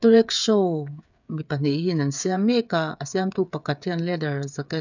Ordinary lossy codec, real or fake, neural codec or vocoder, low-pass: none; fake; codec, 16 kHz, 8 kbps, FreqCodec, smaller model; 7.2 kHz